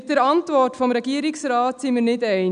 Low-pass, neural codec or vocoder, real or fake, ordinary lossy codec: 9.9 kHz; none; real; none